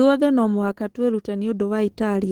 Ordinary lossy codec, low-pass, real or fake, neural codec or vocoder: Opus, 16 kbps; 19.8 kHz; fake; codec, 44.1 kHz, 7.8 kbps, DAC